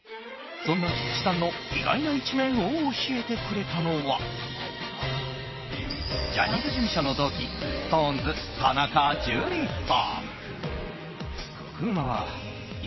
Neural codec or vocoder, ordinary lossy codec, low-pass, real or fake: vocoder, 22.05 kHz, 80 mel bands, WaveNeXt; MP3, 24 kbps; 7.2 kHz; fake